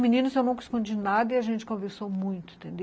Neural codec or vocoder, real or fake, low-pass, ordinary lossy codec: none; real; none; none